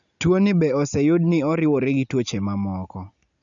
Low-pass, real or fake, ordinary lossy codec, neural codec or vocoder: 7.2 kHz; real; none; none